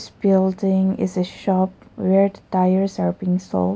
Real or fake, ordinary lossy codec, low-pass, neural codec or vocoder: real; none; none; none